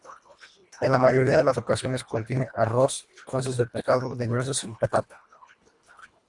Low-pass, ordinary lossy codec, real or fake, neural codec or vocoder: 10.8 kHz; Opus, 64 kbps; fake; codec, 24 kHz, 1.5 kbps, HILCodec